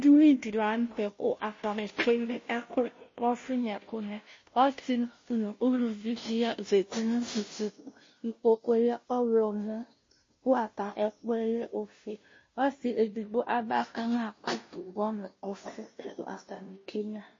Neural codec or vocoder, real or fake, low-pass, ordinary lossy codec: codec, 16 kHz, 0.5 kbps, FunCodec, trained on Chinese and English, 25 frames a second; fake; 7.2 kHz; MP3, 32 kbps